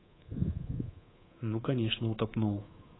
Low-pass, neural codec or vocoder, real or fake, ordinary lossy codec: 7.2 kHz; codec, 24 kHz, 3.1 kbps, DualCodec; fake; AAC, 16 kbps